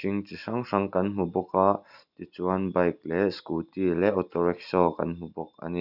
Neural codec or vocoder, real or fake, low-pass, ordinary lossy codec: none; real; 5.4 kHz; none